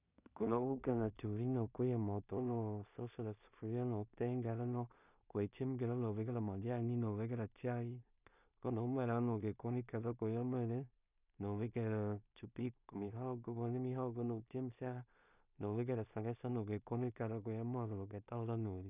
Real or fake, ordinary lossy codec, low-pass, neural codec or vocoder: fake; none; 3.6 kHz; codec, 16 kHz in and 24 kHz out, 0.4 kbps, LongCat-Audio-Codec, two codebook decoder